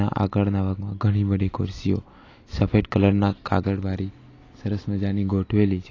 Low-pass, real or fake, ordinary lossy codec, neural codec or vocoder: 7.2 kHz; real; AAC, 32 kbps; none